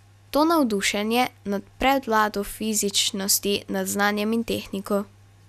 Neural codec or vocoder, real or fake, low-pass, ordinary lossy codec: none; real; 14.4 kHz; none